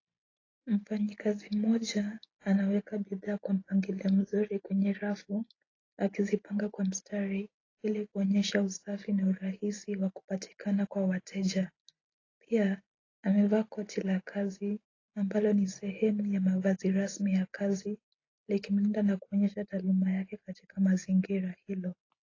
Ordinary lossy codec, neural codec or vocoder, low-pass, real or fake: AAC, 32 kbps; none; 7.2 kHz; real